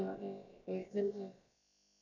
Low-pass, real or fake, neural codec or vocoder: 7.2 kHz; fake; codec, 16 kHz, about 1 kbps, DyCAST, with the encoder's durations